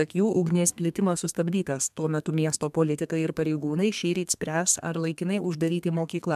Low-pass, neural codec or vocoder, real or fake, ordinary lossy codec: 14.4 kHz; codec, 32 kHz, 1.9 kbps, SNAC; fake; MP3, 96 kbps